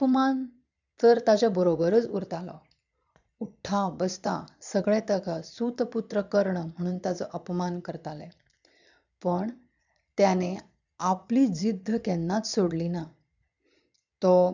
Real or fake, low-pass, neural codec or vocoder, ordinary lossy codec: fake; 7.2 kHz; vocoder, 22.05 kHz, 80 mel bands, Vocos; none